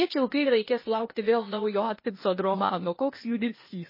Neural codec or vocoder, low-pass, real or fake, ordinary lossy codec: codec, 16 kHz, 0.8 kbps, ZipCodec; 5.4 kHz; fake; MP3, 24 kbps